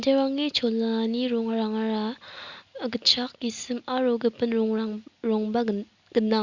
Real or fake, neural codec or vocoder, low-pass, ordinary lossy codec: real; none; 7.2 kHz; Opus, 64 kbps